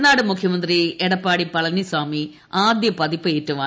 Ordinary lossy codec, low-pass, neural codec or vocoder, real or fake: none; none; none; real